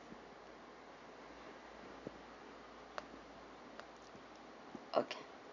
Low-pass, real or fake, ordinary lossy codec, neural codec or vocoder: 7.2 kHz; real; none; none